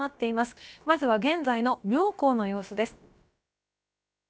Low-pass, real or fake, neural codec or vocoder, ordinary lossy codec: none; fake; codec, 16 kHz, about 1 kbps, DyCAST, with the encoder's durations; none